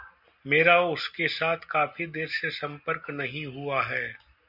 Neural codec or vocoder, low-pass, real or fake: none; 5.4 kHz; real